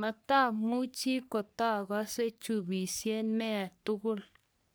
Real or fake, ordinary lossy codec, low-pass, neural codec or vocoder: fake; none; none; codec, 44.1 kHz, 3.4 kbps, Pupu-Codec